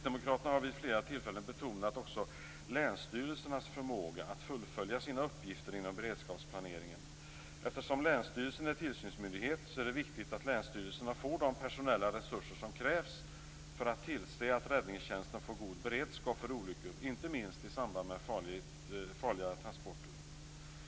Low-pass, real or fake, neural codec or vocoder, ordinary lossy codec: none; real; none; none